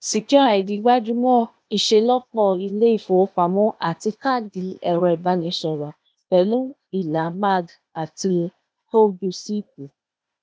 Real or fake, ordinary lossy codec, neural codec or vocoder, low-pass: fake; none; codec, 16 kHz, 0.8 kbps, ZipCodec; none